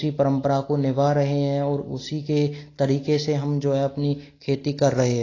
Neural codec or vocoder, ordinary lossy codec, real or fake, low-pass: none; AAC, 32 kbps; real; 7.2 kHz